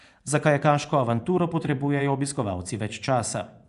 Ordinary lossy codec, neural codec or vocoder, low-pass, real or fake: none; vocoder, 24 kHz, 100 mel bands, Vocos; 10.8 kHz; fake